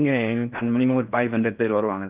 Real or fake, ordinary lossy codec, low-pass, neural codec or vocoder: fake; Opus, 24 kbps; 3.6 kHz; codec, 16 kHz in and 24 kHz out, 0.6 kbps, FocalCodec, streaming, 4096 codes